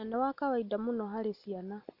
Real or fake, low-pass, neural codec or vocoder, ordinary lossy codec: real; 5.4 kHz; none; none